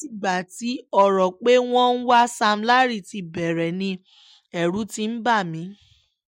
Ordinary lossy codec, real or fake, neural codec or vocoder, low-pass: MP3, 64 kbps; real; none; 19.8 kHz